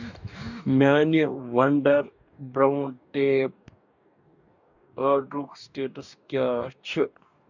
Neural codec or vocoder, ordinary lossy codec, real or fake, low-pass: codec, 44.1 kHz, 2.6 kbps, DAC; none; fake; 7.2 kHz